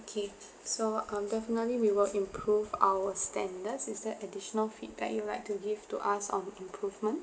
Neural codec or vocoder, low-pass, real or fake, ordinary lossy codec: none; none; real; none